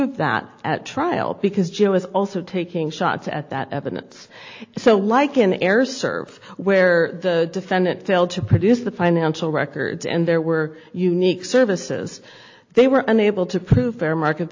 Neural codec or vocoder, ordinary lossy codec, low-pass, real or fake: none; AAC, 48 kbps; 7.2 kHz; real